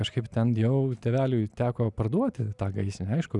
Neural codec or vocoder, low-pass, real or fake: none; 10.8 kHz; real